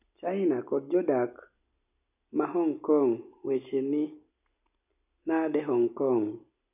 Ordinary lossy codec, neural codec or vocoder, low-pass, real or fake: none; none; 3.6 kHz; real